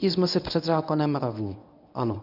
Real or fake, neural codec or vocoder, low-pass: fake; codec, 24 kHz, 0.9 kbps, WavTokenizer, medium speech release version 1; 5.4 kHz